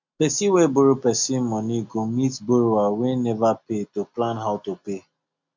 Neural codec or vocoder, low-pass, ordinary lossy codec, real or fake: none; 7.2 kHz; MP3, 64 kbps; real